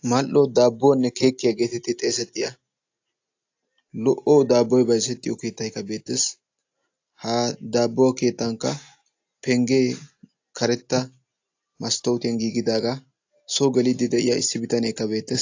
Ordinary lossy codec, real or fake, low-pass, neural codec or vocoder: AAC, 48 kbps; real; 7.2 kHz; none